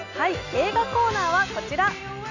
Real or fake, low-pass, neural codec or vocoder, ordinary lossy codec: real; 7.2 kHz; none; none